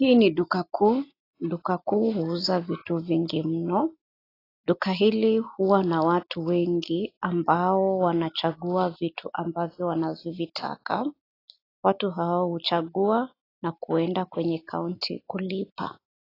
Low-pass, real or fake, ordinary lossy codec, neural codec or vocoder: 5.4 kHz; real; AAC, 24 kbps; none